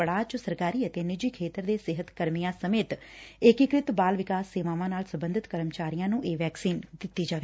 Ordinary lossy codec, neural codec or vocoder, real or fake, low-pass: none; none; real; none